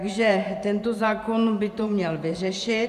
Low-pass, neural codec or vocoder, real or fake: 14.4 kHz; vocoder, 44.1 kHz, 128 mel bands every 512 samples, BigVGAN v2; fake